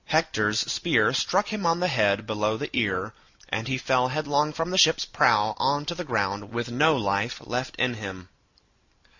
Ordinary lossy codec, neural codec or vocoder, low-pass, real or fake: Opus, 64 kbps; none; 7.2 kHz; real